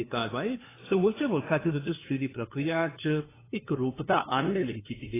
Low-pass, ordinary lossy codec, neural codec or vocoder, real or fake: 3.6 kHz; AAC, 16 kbps; codec, 16 kHz, 2 kbps, FunCodec, trained on LibriTTS, 25 frames a second; fake